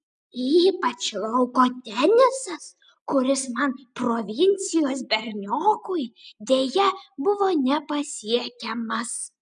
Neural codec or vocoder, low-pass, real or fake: none; 9.9 kHz; real